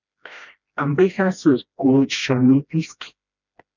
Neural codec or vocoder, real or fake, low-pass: codec, 16 kHz, 1 kbps, FreqCodec, smaller model; fake; 7.2 kHz